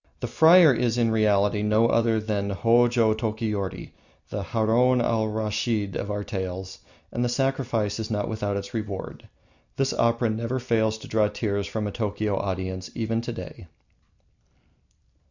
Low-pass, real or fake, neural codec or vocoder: 7.2 kHz; real; none